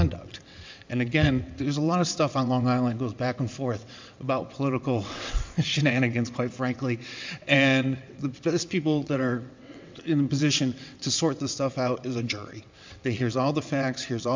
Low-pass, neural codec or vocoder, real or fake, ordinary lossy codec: 7.2 kHz; vocoder, 22.05 kHz, 80 mel bands, WaveNeXt; fake; MP3, 64 kbps